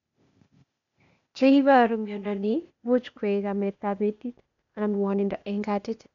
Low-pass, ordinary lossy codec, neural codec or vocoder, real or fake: 7.2 kHz; MP3, 96 kbps; codec, 16 kHz, 0.8 kbps, ZipCodec; fake